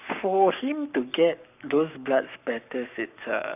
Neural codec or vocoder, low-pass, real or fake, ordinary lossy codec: codec, 44.1 kHz, 7.8 kbps, Pupu-Codec; 3.6 kHz; fake; none